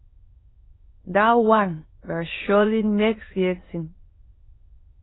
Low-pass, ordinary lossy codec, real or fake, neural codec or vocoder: 7.2 kHz; AAC, 16 kbps; fake; autoencoder, 22.05 kHz, a latent of 192 numbers a frame, VITS, trained on many speakers